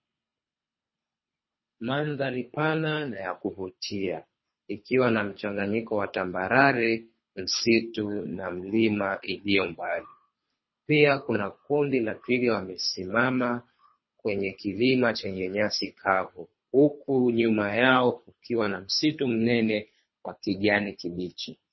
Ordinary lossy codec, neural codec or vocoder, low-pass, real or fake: MP3, 24 kbps; codec, 24 kHz, 3 kbps, HILCodec; 7.2 kHz; fake